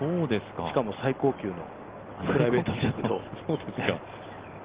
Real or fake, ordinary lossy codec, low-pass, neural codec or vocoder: real; Opus, 16 kbps; 3.6 kHz; none